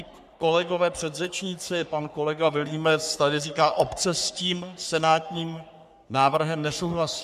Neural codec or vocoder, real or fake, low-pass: codec, 44.1 kHz, 3.4 kbps, Pupu-Codec; fake; 14.4 kHz